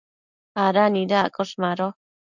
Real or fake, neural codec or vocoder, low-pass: real; none; 7.2 kHz